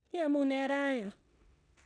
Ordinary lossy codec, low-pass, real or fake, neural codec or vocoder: none; 9.9 kHz; fake; codec, 16 kHz in and 24 kHz out, 0.9 kbps, LongCat-Audio-Codec, four codebook decoder